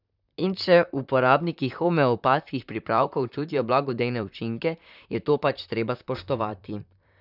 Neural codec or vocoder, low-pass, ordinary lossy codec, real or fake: vocoder, 44.1 kHz, 128 mel bands, Pupu-Vocoder; 5.4 kHz; none; fake